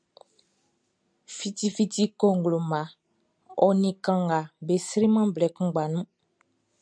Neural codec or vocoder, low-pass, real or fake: none; 9.9 kHz; real